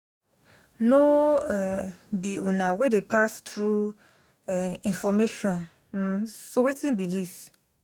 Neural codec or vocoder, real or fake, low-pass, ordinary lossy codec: codec, 44.1 kHz, 2.6 kbps, DAC; fake; 19.8 kHz; none